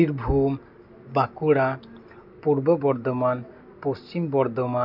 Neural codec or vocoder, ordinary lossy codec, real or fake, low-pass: none; none; real; 5.4 kHz